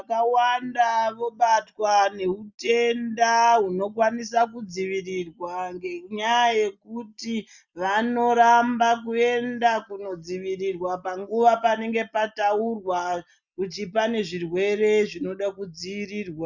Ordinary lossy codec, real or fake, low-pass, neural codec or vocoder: Opus, 64 kbps; real; 7.2 kHz; none